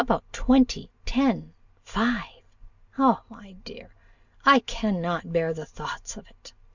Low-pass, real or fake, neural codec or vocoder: 7.2 kHz; real; none